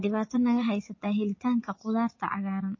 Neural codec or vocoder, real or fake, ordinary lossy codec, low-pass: vocoder, 24 kHz, 100 mel bands, Vocos; fake; MP3, 32 kbps; 7.2 kHz